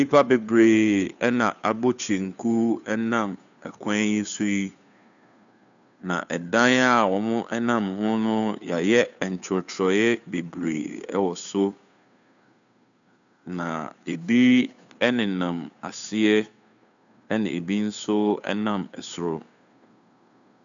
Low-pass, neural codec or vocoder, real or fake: 7.2 kHz; codec, 16 kHz, 2 kbps, FunCodec, trained on Chinese and English, 25 frames a second; fake